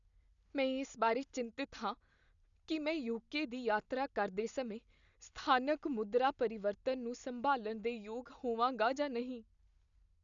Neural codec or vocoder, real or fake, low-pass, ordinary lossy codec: none; real; 7.2 kHz; none